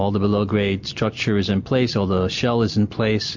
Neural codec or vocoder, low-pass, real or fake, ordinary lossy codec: none; 7.2 kHz; real; MP3, 48 kbps